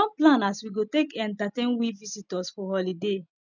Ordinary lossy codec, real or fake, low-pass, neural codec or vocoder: none; real; 7.2 kHz; none